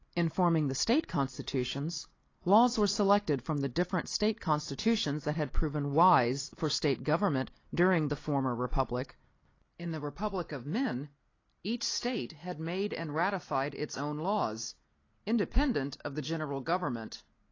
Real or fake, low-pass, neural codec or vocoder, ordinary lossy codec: real; 7.2 kHz; none; AAC, 32 kbps